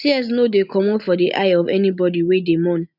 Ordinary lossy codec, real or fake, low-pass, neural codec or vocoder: none; real; 5.4 kHz; none